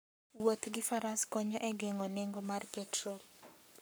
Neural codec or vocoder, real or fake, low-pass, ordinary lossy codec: codec, 44.1 kHz, 7.8 kbps, Pupu-Codec; fake; none; none